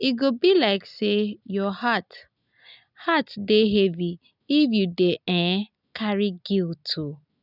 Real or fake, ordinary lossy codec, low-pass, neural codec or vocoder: real; none; 5.4 kHz; none